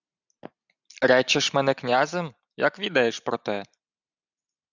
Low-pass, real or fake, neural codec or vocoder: 7.2 kHz; real; none